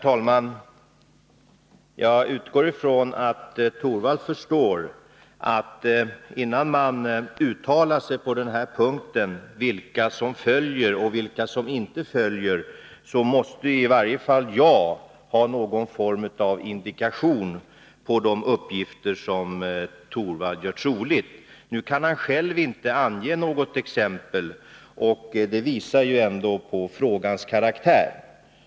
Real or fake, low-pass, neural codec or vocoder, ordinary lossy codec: real; none; none; none